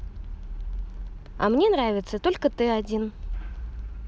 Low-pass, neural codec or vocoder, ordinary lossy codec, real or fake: none; none; none; real